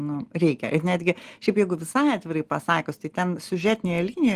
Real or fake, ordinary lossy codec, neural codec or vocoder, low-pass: real; Opus, 24 kbps; none; 14.4 kHz